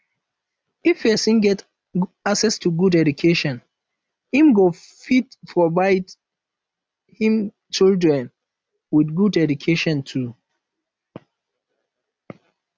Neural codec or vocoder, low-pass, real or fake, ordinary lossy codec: none; none; real; none